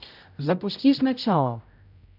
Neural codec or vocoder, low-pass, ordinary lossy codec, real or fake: codec, 16 kHz, 0.5 kbps, X-Codec, HuBERT features, trained on general audio; 5.4 kHz; none; fake